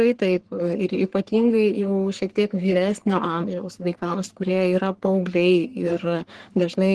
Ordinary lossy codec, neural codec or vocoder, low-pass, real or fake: Opus, 16 kbps; codec, 44.1 kHz, 3.4 kbps, Pupu-Codec; 10.8 kHz; fake